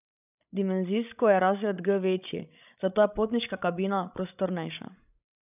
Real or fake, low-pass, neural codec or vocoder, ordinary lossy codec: fake; 3.6 kHz; codec, 16 kHz, 16 kbps, FreqCodec, larger model; none